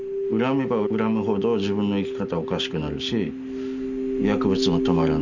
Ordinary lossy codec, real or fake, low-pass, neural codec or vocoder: none; real; 7.2 kHz; none